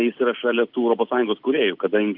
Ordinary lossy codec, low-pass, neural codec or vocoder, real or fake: Opus, 32 kbps; 5.4 kHz; none; real